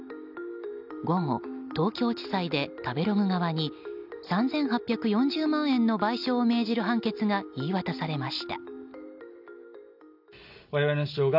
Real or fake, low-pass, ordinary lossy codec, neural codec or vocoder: real; 5.4 kHz; none; none